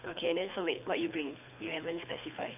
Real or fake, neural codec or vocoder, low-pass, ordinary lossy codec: fake; codec, 24 kHz, 3 kbps, HILCodec; 3.6 kHz; none